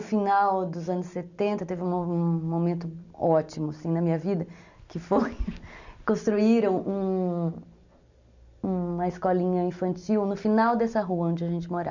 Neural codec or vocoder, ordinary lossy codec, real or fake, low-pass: none; none; real; 7.2 kHz